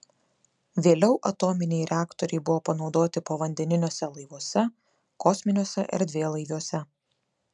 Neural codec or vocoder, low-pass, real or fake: none; 10.8 kHz; real